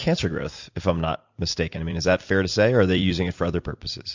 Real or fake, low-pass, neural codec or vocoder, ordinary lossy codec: fake; 7.2 kHz; vocoder, 44.1 kHz, 128 mel bands every 256 samples, BigVGAN v2; MP3, 64 kbps